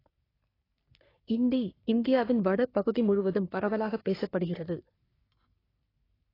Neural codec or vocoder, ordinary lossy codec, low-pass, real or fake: codec, 44.1 kHz, 3.4 kbps, Pupu-Codec; AAC, 24 kbps; 5.4 kHz; fake